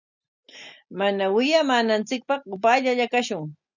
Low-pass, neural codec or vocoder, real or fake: 7.2 kHz; none; real